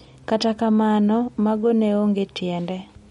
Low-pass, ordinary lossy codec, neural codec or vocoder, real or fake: 19.8 kHz; MP3, 48 kbps; none; real